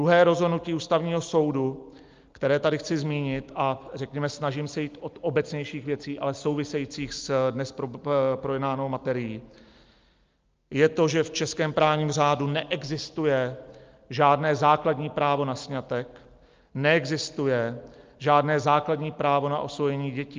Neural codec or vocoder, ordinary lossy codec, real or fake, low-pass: none; Opus, 24 kbps; real; 7.2 kHz